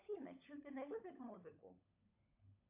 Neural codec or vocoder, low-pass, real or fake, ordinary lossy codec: codec, 16 kHz, 16 kbps, FunCodec, trained on LibriTTS, 50 frames a second; 3.6 kHz; fake; AAC, 32 kbps